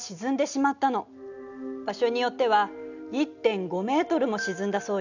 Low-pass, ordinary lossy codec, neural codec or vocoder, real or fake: 7.2 kHz; none; none; real